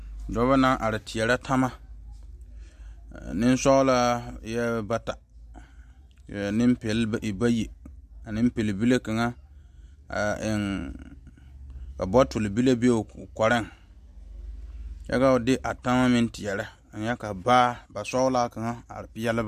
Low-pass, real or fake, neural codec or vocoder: 14.4 kHz; real; none